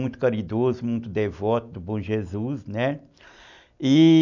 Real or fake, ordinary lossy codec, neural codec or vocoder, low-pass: real; none; none; 7.2 kHz